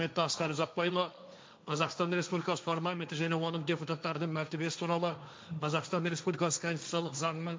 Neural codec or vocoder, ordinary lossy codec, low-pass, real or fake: codec, 16 kHz, 1.1 kbps, Voila-Tokenizer; none; none; fake